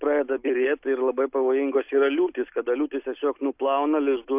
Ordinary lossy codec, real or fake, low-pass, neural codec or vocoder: AAC, 32 kbps; real; 3.6 kHz; none